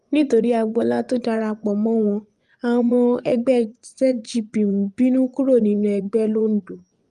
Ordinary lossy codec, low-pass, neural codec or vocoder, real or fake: Opus, 32 kbps; 10.8 kHz; vocoder, 24 kHz, 100 mel bands, Vocos; fake